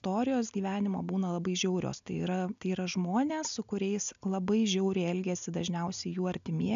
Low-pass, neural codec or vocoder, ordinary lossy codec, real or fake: 7.2 kHz; none; AAC, 96 kbps; real